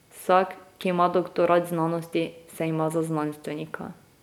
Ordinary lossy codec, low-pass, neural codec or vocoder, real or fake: none; 19.8 kHz; none; real